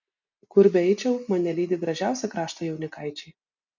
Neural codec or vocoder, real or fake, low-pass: none; real; 7.2 kHz